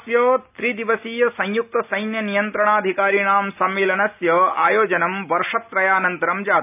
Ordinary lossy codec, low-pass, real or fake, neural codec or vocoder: none; 3.6 kHz; real; none